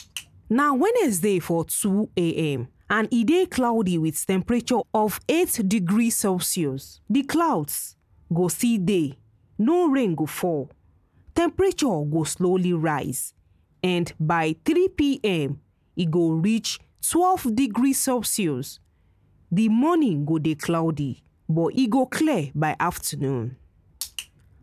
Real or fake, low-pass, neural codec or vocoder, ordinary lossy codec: real; 14.4 kHz; none; none